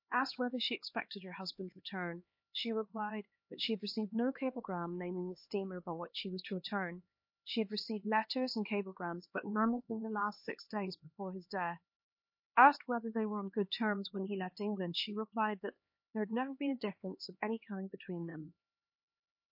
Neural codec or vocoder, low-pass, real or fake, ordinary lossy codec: codec, 16 kHz, 2 kbps, X-Codec, HuBERT features, trained on LibriSpeech; 5.4 kHz; fake; MP3, 32 kbps